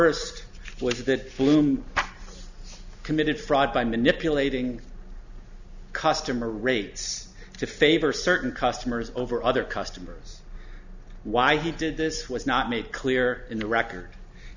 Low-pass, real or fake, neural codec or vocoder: 7.2 kHz; real; none